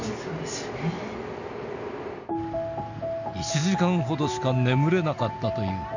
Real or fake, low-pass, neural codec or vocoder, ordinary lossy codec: real; 7.2 kHz; none; none